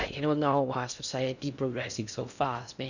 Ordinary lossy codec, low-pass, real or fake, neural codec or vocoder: none; 7.2 kHz; fake; codec, 16 kHz in and 24 kHz out, 0.6 kbps, FocalCodec, streaming, 2048 codes